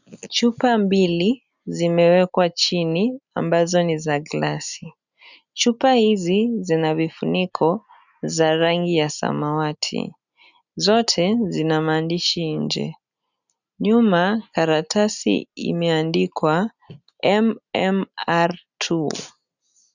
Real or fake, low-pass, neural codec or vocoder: real; 7.2 kHz; none